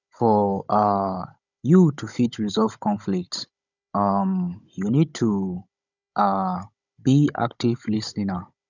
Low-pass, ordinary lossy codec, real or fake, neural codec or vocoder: 7.2 kHz; none; fake; codec, 16 kHz, 16 kbps, FunCodec, trained on Chinese and English, 50 frames a second